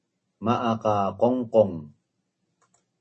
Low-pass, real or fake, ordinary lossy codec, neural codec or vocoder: 10.8 kHz; real; MP3, 32 kbps; none